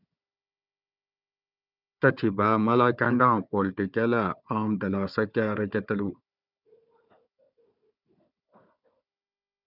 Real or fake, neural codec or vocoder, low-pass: fake; codec, 16 kHz, 4 kbps, FunCodec, trained on Chinese and English, 50 frames a second; 5.4 kHz